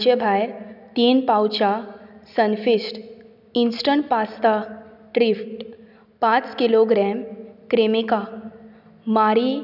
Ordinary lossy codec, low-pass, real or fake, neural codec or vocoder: none; 5.4 kHz; real; none